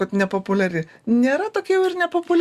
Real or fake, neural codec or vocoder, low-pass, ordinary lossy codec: real; none; 14.4 kHz; Opus, 64 kbps